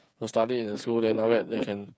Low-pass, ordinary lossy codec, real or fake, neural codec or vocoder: none; none; fake; codec, 16 kHz, 8 kbps, FreqCodec, smaller model